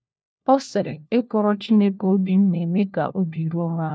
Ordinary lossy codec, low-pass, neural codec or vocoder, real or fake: none; none; codec, 16 kHz, 1 kbps, FunCodec, trained on LibriTTS, 50 frames a second; fake